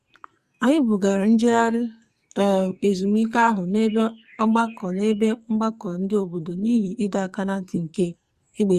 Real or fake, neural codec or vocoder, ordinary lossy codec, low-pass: fake; codec, 44.1 kHz, 2.6 kbps, SNAC; Opus, 64 kbps; 14.4 kHz